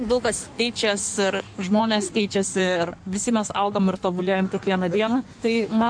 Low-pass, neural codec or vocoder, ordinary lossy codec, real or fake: 9.9 kHz; codec, 16 kHz in and 24 kHz out, 1.1 kbps, FireRedTTS-2 codec; MP3, 64 kbps; fake